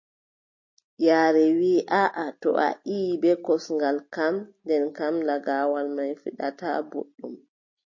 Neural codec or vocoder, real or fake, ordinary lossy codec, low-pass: none; real; MP3, 32 kbps; 7.2 kHz